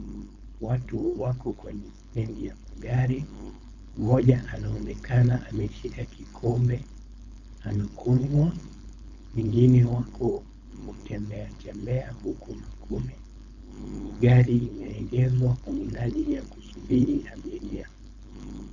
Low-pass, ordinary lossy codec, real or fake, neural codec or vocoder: 7.2 kHz; Opus, 64 kbps; fake; codec, 16 kHz, 4.8 kbps, FACodec